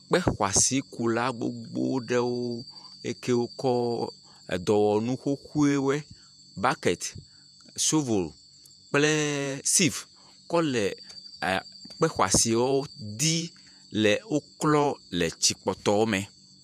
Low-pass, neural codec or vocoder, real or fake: 14.4 kHz; vocoder, 48 kHz, 128 mel bands, Vocos; fake